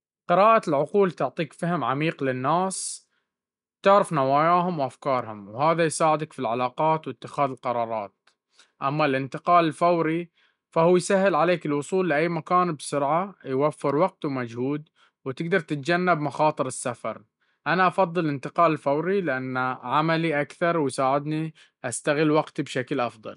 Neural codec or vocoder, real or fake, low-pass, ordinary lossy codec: none; real; 10.8 kHz; none